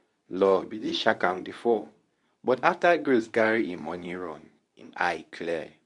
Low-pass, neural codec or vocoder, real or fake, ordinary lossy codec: 10.8 kHz; codec, 24 kHz, 0.9 kbps, WavTokenizer, medium speech release version 2; fake; none